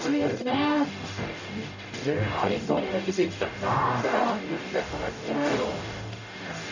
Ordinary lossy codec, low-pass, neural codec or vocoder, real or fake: none; 7.2 kHz; codec, 44.1 kHz, 0.9 kbps, DAC; fake